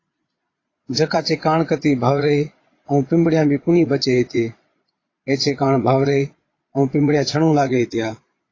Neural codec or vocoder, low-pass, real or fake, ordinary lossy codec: vocoder, 22.05 kHz, 80 mel bands, Vocos; 7.2 kHz; fake; AAC, 32 kbps